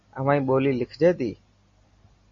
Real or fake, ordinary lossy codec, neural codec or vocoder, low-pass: real; MP3, 32 kbps; none; 7.2 kHz